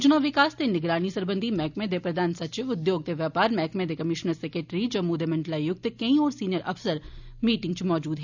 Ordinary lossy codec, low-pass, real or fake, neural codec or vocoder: none; 7.2 kHz; real; none